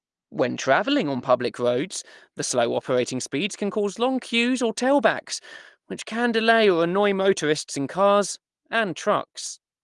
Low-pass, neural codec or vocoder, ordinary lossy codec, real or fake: 10.8 kHz; none; Opus, 24 kbps; real